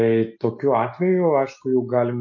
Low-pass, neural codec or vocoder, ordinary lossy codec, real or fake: 7.2 kHz; none; MP3, 32 kbps; real